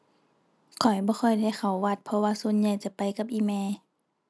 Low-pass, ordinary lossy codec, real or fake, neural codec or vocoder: none; none; real; none